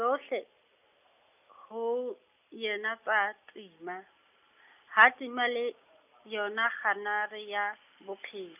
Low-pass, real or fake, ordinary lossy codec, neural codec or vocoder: 3.6 kHz; real; none; none